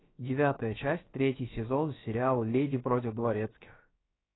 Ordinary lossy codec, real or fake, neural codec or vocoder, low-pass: AAC, 16 kbps; fake; codec, 16 kHz, about 1 kbps, DyCAST, with the encoder's durations; 7.2 kHz